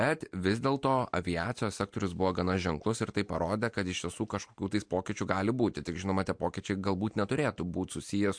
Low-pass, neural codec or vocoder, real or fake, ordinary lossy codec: 9.9 kHz; none; real; MP3, 64 kbps